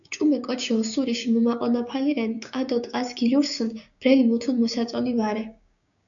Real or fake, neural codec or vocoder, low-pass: fake; codec, 16 kHz, 6 kbps, DAC; 7.2 kHz